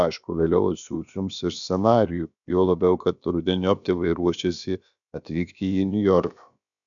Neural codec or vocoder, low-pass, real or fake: codec, 16 kHz, about 1 kbps, DyCAST, with the encoder's durations; 7.2 kHz; fake